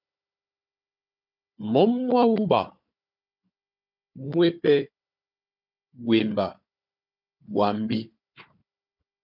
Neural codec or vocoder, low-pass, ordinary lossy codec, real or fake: codec, 16 kHz, 4 kbps, FunCodec, trained on Chinese and English, 50 frames a second; 5.4 kHz; MP3, 48 kbps; fake